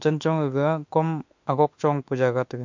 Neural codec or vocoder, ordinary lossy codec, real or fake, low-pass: autoencoder, 48 kHz, 32 numbers a frame, DAC-VAE, trained on Japanese speech; MP3, 48 kbps; fake; 7.2 kHz